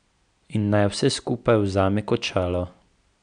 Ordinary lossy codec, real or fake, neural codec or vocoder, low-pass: none; real; none; 9.9 kHz